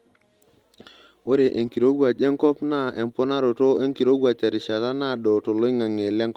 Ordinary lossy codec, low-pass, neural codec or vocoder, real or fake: Opus, 24 kbps; 19.8 kHz; none; real